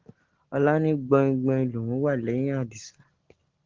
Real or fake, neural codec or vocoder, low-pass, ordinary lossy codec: real; none; 7.2 kHz; Opus, 16 kbps